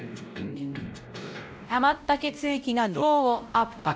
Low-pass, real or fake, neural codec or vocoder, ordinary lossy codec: none; fake; codec, 16 kHz, 0.5 kbps, X-Codec, WavLM features, trained on Multilingual LibriSpeech; none